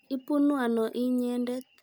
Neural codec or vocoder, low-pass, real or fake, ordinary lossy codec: none; none; real; none